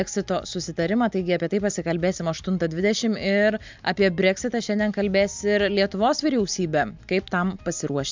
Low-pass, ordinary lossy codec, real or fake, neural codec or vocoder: 7.2 kHz; MP3, 64 kbps; real; none